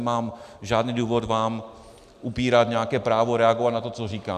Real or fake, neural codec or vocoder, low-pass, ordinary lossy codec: real; none; 14.4 kHz; Opus, 64 kbps